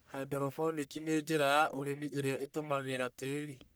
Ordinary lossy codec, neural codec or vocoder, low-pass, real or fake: none; codec, 44.1 kHz, 1.7 kbps, Pupu-Codec; none; fake